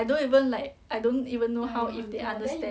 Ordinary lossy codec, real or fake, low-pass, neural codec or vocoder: none; real; none; none